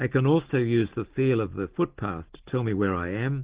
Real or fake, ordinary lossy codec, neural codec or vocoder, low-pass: real; Opus, 16 kbps; none; 3.6 kHz